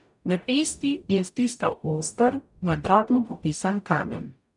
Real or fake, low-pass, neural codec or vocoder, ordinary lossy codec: fake; 10.8 kHz; codec, 44.1 kHz, 0.9 kbps, DAC; none